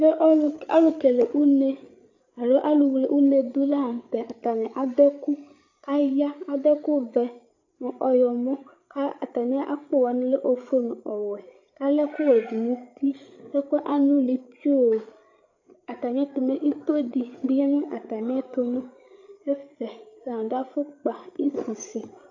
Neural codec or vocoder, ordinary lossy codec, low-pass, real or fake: codec, 16 kHz, 8 kbps, FreqCodec, larger model; AAC, 48 kbps; 7.2 kHz; fake